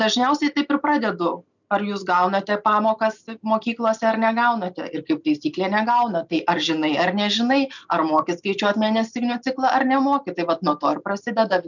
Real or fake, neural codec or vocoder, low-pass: real; none; 7.2 kHz